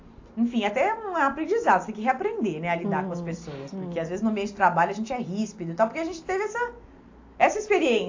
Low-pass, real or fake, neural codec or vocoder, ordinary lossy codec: 7.2 kHz; real; none; AAC, 48 kbps